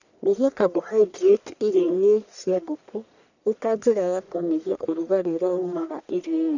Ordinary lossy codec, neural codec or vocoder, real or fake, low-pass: none; codec, 44.1 kHz, 1.7 kbps, Pupu-Codec; fake; 7.2 kHz